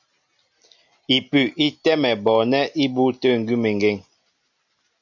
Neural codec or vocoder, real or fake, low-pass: none; real; 7.2 kHz